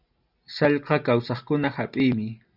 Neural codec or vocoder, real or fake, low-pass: none; real; 5.4 kHz